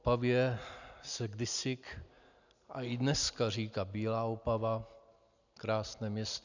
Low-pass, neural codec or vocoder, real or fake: 7.2 kHz; none; real